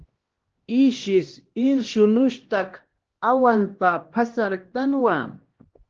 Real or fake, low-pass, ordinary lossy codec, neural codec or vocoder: fake; 7.2 kHz; Opus, 16 kbps; codec, 16 kHz, 1 kbps, X-Codec, HuBERT features, trained on LibriSpeech